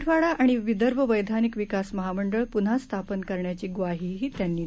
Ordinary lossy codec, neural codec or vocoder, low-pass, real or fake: none; none; none; real